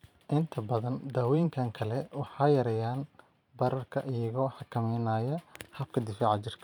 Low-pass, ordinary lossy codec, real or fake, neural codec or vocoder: 19.8 kHz; none; fake; vocoder, 44.1 kHz, 128 mel bands every 512 samples, BigVGAN v2